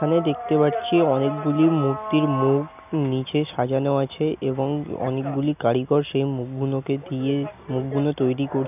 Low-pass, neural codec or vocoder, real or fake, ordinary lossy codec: 3.6 kHz; none; real; none